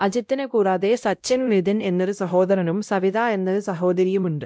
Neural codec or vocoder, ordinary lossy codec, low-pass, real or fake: codec, 16 kHz, 0.5 kbps, X-Codec, WavLM features, trained on Multilingual LibriSpeech; none; none; fake